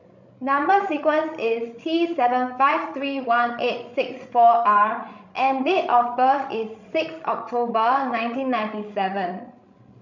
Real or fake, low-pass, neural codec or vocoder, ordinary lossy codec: fake; 7.2 kHz; codec, 16 kHz, 16 kbps, FreqCodec, larger model; none